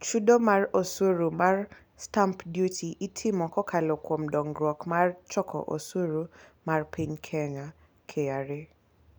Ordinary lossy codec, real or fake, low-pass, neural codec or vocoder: none; real; none; none